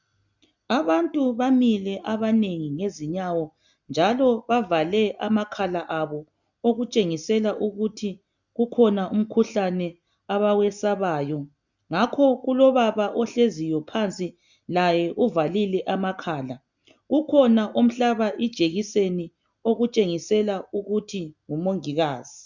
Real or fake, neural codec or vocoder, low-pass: real; none; 7.2 kHz